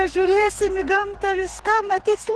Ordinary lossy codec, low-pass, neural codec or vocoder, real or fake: Opus, 16 kbps; 10.8 kHz; codec, 32 kHz, 1.9 kbps, SNAC; fake